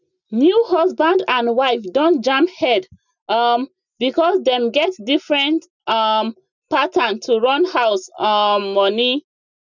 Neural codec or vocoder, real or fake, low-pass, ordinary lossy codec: none; real; 7.2 kHz; none